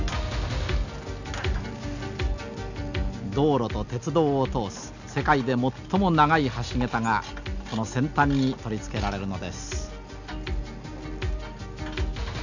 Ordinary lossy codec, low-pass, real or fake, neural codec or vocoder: none; 7.2 kHz; real; none